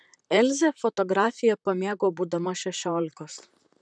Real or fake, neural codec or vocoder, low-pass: fake; vocoder, 44.1 kHz, 128 mel bands, Pupu-Vocoder; 9.9 kHz